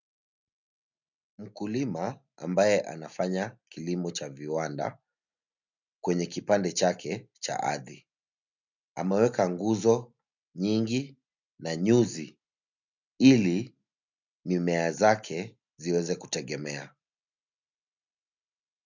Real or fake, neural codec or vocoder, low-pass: real; none; 7.2 kHz